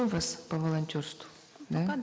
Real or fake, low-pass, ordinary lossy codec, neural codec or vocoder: real; none; none; none